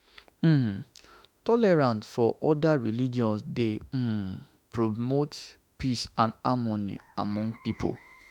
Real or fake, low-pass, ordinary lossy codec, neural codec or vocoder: fake; 19.8 kHz; none; autoencoder, 48 kHz, 32 numbers a frame, DAC-VAE, trained on Japanese speech